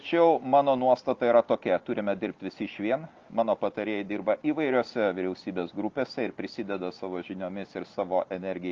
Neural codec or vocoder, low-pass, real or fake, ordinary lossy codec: none; 7.2 kHz; real; Opus, 32 kbps